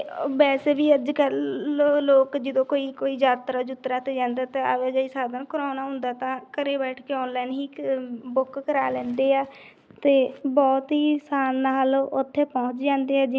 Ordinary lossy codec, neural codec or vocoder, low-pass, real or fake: none; none; none; real